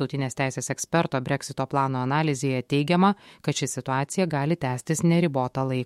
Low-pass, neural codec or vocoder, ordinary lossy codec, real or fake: 19.8 kHz; autoencoder, 48 kHz, 128 numbers a frame, DAC-VAE, trained on Japanese speech; MP3, 64 kbps; fake